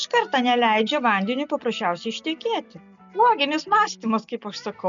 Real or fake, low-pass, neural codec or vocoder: real; 7.2 kHz; none